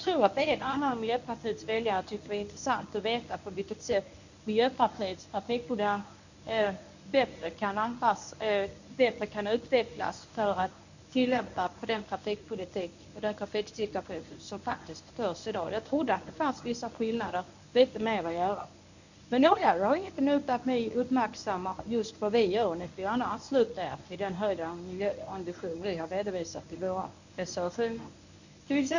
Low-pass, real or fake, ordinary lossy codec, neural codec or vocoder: 7.2 kHz; fake; none; codec, 24 kHz, 0.9 kbps, WavTokenizer, medium speech release version 1